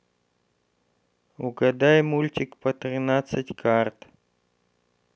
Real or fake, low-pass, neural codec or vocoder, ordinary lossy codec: real; none; none; none